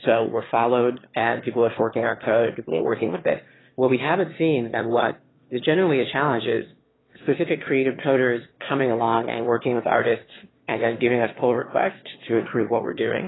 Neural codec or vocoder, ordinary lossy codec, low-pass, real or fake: autoencoder, 22.05 kHz, a latent of 192 numbers a frame, VITS, trained on one speaker; AAC, 16 kbps; 7.2 kHz; fake